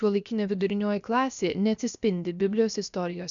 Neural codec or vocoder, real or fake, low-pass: codec, 16 kHz, about 1 kbps, DyCAST, with the encoder's durations; fake; 7.2 kHz